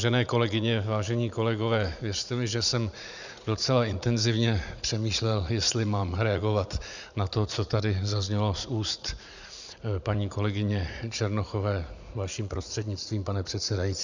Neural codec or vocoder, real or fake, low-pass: vocoder, 44.1 kHz, 80 mel bands, Vocos; fake; 7.2 kHz